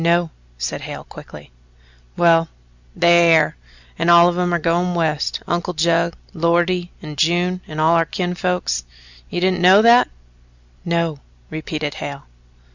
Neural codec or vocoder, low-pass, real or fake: none; 7.2 kHz; real